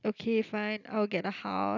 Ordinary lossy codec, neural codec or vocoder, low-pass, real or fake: none; none; 7.2 kHz; real